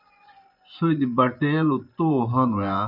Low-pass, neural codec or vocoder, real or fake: 5.4 kHz; vocoder, 24 kHz, 100 mel bands, Vocos; fake